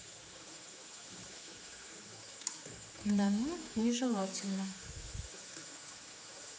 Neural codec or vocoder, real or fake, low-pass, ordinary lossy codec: codec, 16 kHz, 4 kbps, X-Codec, HuBERT features, trained on general audio; fake; none; none